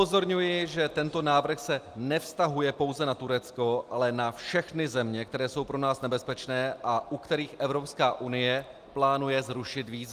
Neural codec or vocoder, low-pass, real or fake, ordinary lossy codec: none; 14.4 kHz; real; Opus, 32 kbps